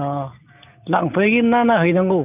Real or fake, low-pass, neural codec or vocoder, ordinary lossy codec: real; 3.6 kHz; none; none